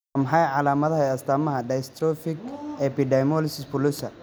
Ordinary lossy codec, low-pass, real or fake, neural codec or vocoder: none; none; real; none